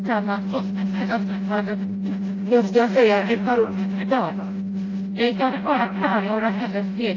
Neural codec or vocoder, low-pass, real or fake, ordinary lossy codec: codec, 16 kHz, 0.5 kbps, FreqCodec, smaller model; 7.2 kHz; fake; AAC, 32 kbps